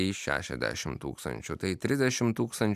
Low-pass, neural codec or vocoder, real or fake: 14.4 kHz; none; real